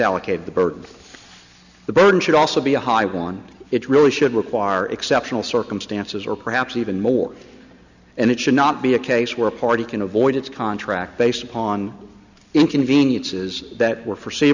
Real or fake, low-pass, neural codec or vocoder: real; 7.2 kHz; none